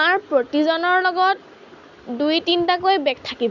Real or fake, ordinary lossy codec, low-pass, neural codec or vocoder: real; none; 7.2 kHz; none